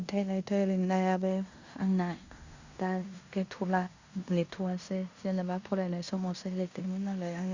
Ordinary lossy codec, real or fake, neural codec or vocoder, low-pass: Opus, 64 kbps; fake; codec, 16 kHz in and 24 kHz out, 0.9 kbps, LongCat-Audio-Codec, fine tuned four codebook decoder; 7.2 kHz